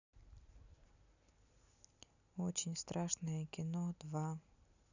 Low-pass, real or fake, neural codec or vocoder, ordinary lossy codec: 7.2 kHz; real; none; none